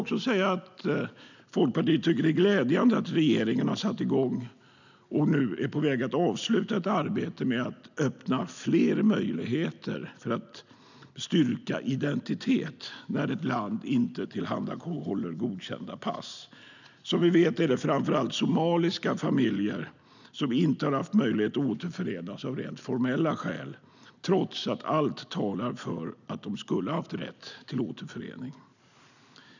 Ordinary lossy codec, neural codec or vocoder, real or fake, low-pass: none; none; real; 7.2 kHz